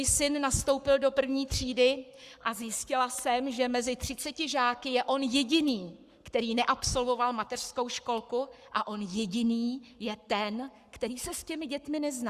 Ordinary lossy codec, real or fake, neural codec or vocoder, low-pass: Opus, 64 kbps; fake; codec, 44.1 kHz, 7.8 kbps, Pupu-Codec; 14.4 kHz